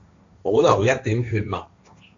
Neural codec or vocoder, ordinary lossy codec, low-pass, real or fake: codec, 16 kHz, 1.1 kbps, Voila-Tokenizer; AAC, 48 kbps; 7.2 kHz; fake